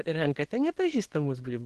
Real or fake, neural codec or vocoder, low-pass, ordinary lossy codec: fake; codec, 24 kHz, 0.9 kbps, WavTokenizer, small release; 10.8 kHz; Opus, 16 kbps